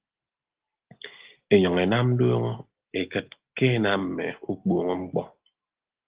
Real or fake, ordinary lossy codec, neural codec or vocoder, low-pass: real; Opus, 16 kbps; none; 3.6 kHz